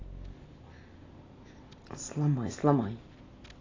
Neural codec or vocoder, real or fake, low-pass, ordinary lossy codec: none; real; 7.2 kHz; AAC, 32 kbps